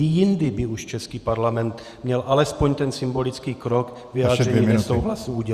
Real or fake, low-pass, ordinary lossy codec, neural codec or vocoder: fake; 14.4 kHz; Opus, 64 kbps; vocoder, 48 kHz, 128 mel bands, Vocos